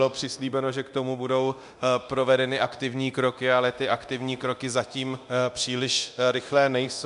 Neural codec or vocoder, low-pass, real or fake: codec, 24 kHz, 0.9 kbps, DualCodec; 10.8 kHz; fake